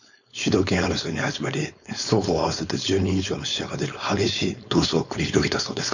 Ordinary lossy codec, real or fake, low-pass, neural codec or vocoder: none; fake; 7.2 kHz; codec, 16 kHz, 4.8 kbps, FACodec